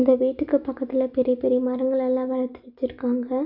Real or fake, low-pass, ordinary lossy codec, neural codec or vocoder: real; 5.4 kHz; none; none